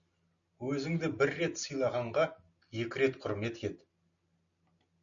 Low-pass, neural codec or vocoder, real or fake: 7.2 kHz; none; real